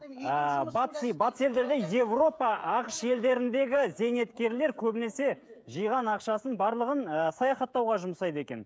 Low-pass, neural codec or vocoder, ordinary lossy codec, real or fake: none; codec, 16 kHz, 16 kbps, FreqCodec, smaller model; none; fake